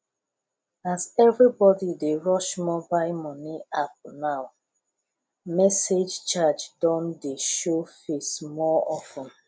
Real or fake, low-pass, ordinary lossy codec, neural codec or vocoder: real; none; none; none